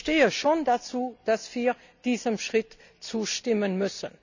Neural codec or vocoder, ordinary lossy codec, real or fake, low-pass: none; none; real; 7.2 kHz